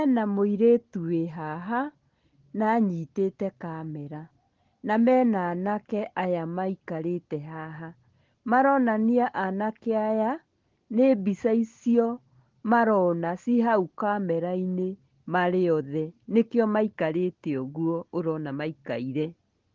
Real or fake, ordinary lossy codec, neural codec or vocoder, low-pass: real; Opus, 16 kbps; none; 7.2 kHz